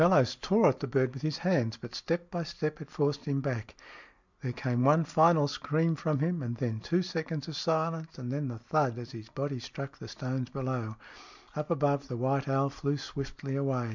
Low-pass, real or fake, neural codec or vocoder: 7.2 kHz; real; none